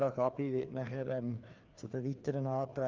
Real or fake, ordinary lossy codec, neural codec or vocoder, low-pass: fake; Opus, 24 kbps; codec, 16 kHz, 2 kbps, FreqCodec, larger model; 7.2 kHz